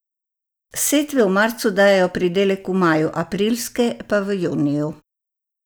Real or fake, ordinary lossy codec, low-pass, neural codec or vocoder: real; none; none; none